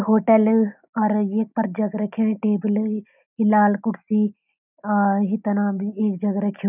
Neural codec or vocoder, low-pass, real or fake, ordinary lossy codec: none; 3.6 kHz; real; none